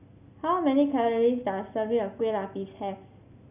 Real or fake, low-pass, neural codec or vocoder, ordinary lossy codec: real; 3.6 kHz; none; none